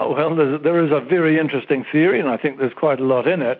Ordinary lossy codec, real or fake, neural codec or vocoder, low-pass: MP3, 48 kbps; real; none; 7.2 kHz